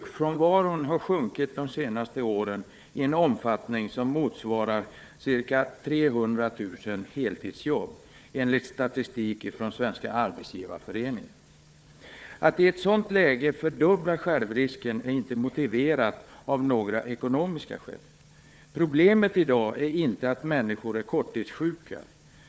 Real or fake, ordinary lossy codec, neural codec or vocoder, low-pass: fake; none; codec, 16 kHz, 4 kbps, FunCodec, trained on Chinese and English, 50 frames a second; none